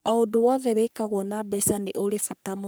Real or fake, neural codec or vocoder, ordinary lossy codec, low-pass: fake; codec, 44.1 kHz, 3.4 kbps, Pupu-Codec; none; none